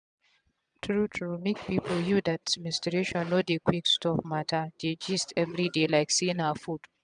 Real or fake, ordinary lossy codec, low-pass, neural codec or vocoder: fake; none; 9.9 kHz; vocoder, 22.05 kHz, 80 mel bands, Vocos